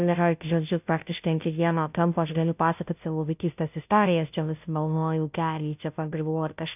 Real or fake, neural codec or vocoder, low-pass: fake; codec, 16 kHz, 0.5 kbps, FunCodec, trained on Chinese and English, 25 frames a second; 3.6 kHz